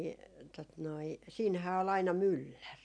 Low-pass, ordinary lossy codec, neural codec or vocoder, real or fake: 9.9 kHz; none; none; real